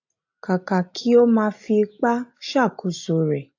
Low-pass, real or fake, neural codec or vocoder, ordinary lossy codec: 7.2 kHz; real; none; AAC, 48 kbps